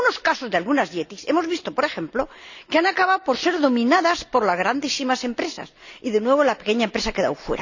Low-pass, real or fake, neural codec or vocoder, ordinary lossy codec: 7.2 kHz; real; none; none